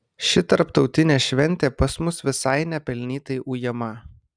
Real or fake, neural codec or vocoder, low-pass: real; none; 9.9 kHz